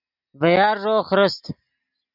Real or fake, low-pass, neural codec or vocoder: real; 5.4 kHz; none